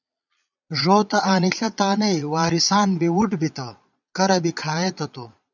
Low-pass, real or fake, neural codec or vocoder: 7.2 kHz; fake; vocoder, 22.05 kHz, 80 mel bands, Vocos